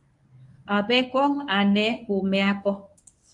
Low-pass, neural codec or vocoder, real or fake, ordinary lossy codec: 10.8 kHz; codec, 24 kHz, 0.9 kbps, WavTokenizer, medium speech release version 1; fake; MP3, 96 kbps